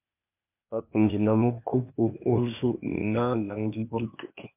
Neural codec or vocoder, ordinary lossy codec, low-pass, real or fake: codec, 16 kHz, 0.8 kbps, ZipCodec; MP3, 32 kbps; 3.6 kHz; fake